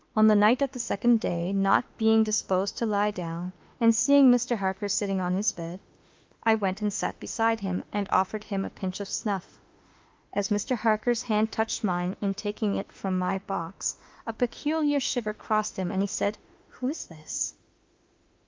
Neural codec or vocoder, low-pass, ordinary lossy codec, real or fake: autoencoder, 48 kHz, 32 numbers a frame, DAC-VAE, trained on Japanese speech; 7.2 kHz; Opus, 32 kbps; fake